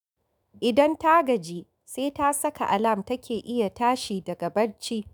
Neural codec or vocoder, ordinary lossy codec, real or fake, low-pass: autoencoder, 48 kHz, 128 numbers a frame, DAC-VAE, trained on Japanese speech; none; fake; none